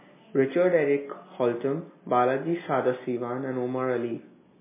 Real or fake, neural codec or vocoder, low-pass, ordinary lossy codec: real; none; 3.6 kHz; MP3, 16 kbps